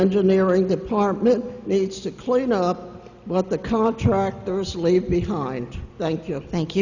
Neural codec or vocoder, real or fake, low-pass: none; real; 7.2 kHz